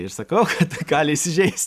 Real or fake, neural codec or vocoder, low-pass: real; none; 14.4 kHz